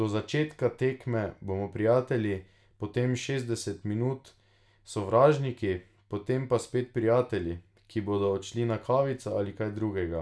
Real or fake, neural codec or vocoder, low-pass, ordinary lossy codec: real; none; none; none